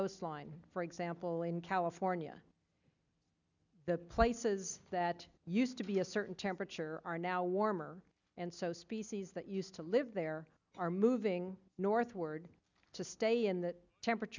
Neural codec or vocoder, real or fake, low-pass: none; real; 7.2 kHz